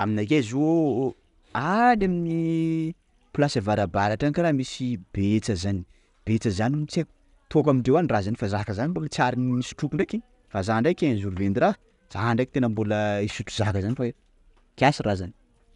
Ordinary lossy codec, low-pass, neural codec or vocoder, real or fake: none; 10.8 kHz; none; real